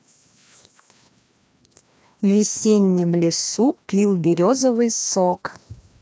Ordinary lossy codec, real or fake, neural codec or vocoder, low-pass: none; fake; codec, 16 kHz, 1 kbps, FreqCodec, larger model; none